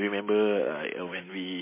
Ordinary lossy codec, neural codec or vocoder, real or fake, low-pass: MP3, 16 kbps; none; real; 3.6 kHz